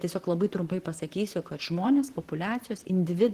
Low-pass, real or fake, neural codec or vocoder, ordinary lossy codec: 14.4 kHz; fake; vocoder, 44.1 kHz, 128 mel bands, Pupu-Vocoder; Opus, 16 kbps